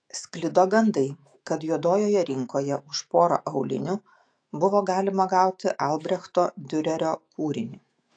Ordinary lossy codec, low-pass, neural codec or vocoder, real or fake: AAC, 48 kbps; 9.9 kHz; autoencoder, 48 kHz, 128 numbers a frame, DAC-VAE, trained on Japanese speech; fake